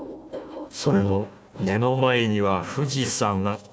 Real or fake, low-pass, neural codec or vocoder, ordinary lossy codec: fake; none; codec, 16 kHz, 1 kbps, FunCodec, trained on Chinese and English, 50 frames a second; none